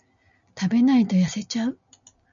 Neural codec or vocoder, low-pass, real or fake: none; 7.2 kHz; real